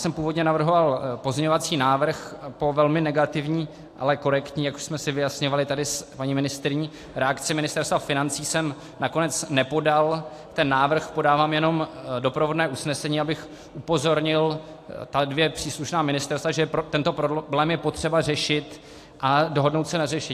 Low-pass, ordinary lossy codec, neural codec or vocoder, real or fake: 14.4 kHz; AAC, 64 kbps; none; real